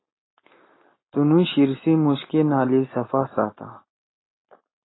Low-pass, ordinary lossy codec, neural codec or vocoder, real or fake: 7.2 kHz; AAC, 16 kbps; none; real